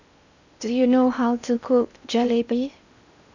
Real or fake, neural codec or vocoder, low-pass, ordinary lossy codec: fake; codec, 16 kHz in and 24 kHz out, 0.8 kbps, FocalCodec, streaming, 65536 codes; 7.2 kHz; none